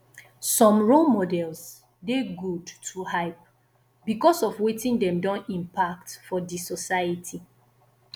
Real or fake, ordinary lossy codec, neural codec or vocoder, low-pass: real; none; none; none